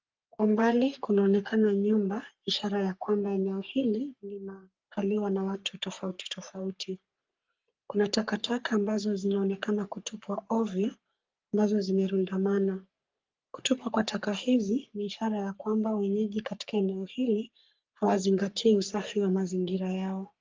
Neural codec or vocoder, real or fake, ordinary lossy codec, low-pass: codec, 44.1 kHz, 3.4 kbps, Pupu-Codec; fake; Opus, 32 kbps; 7.2 kHz